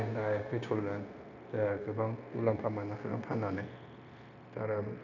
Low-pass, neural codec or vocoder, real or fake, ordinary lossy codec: 7.2 kHz; codec, 16 kHz, 0.9 kbps, LongCat-Audio-Codec; fake; none